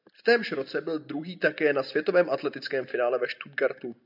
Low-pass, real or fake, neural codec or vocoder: 5.4 kHz; real; none